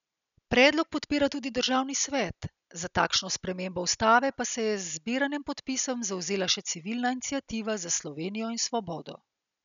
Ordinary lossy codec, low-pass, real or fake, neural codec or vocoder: none; 7.2 kHz; real; none